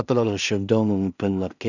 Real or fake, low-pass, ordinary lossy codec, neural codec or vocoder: fake; 7.2 kHz; none; codec, 16 kHz in and 24 kHz out, 0.4 kbps, LongCat-Audio-Codec, two codebook decoder